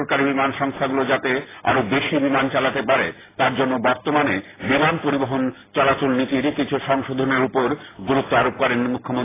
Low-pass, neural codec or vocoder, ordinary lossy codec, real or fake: 3.6 kHz; none; AAC, 16 kbps; real